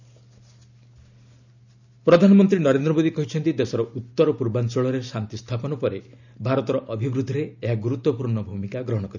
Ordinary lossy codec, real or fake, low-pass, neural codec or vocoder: none; real; 7.2 kHz; none